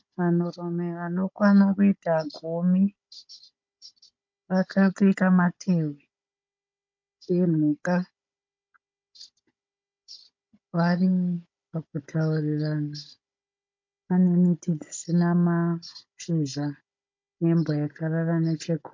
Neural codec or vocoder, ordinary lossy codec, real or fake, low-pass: codec, 16 kHz, 16 kbps, FunCodec, trained on Chinese and English, 50 frames a second; MP3, 48 kbps; fake; 7.2 kHz